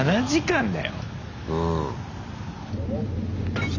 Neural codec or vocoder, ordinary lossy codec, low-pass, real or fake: none; none; 7.2 kHz; real